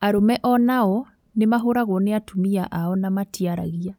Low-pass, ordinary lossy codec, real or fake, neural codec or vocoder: 19.8 kHz; none; real; none